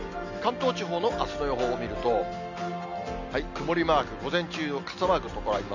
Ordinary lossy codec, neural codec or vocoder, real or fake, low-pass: none; none; real; 7.2 kHz